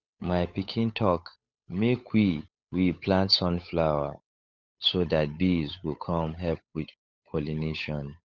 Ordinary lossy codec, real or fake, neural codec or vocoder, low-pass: none; fake; codec, 16 kHz, 8 kbps, FunCodec, trained on Chinese and English, 25 frames a second; none